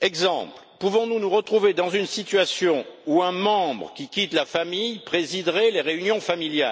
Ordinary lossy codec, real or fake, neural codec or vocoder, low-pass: none; real; none; none